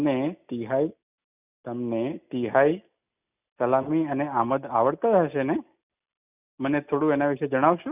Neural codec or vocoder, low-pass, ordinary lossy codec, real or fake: none; 3.6 kHz; none; real